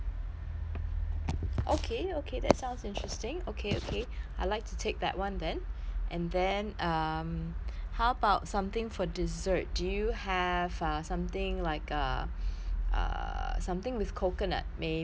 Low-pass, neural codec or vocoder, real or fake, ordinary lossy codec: none; none; real; none